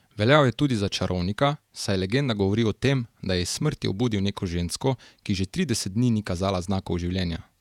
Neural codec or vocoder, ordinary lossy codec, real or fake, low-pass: vocoder, 48 kHz, 128 mel bands, Vocos; none; fake; 19.8 kHz